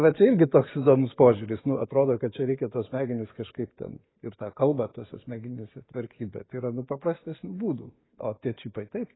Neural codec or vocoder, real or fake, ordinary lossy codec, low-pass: none; real; AAC, 16 kbps; 7.2 kHz